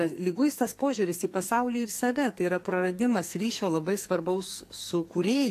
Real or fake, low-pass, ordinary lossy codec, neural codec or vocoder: fake; 14.4 kHz; AAC, 64 kbps; codec, 44.1 kHz, 2.6 kbps, SNAC